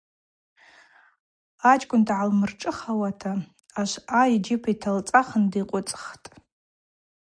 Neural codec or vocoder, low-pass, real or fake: none; 9.9 kHz; real